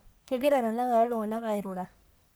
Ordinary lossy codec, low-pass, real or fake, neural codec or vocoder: none; none; fake; codec, 44.1 kHz, 1.7 kbps, Pupu-Codec